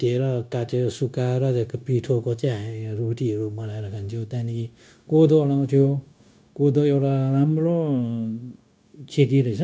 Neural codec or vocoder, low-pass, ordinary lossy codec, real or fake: codec, 16 kHz, 0.9 kbps, LongCat-Audio-Codec; none; none; fake